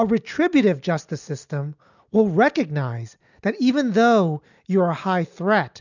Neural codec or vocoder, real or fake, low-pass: none; real; 7.2 kHz